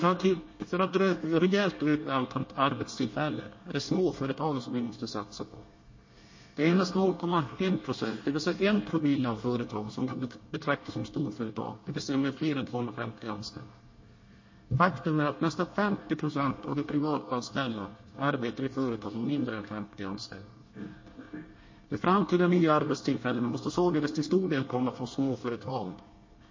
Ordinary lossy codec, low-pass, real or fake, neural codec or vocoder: MP3, 32 kbps; 7.2 kHz; fake; codec, 24 kHz, 1 kbps, SNAC